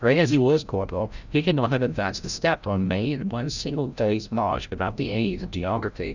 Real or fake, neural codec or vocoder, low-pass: fake; codec, 16 kHz, 0.5 kbps, FreqCodec, larger model; 7.2 kHz